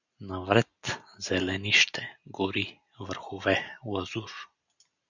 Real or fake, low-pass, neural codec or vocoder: real; 7.2 kHz; none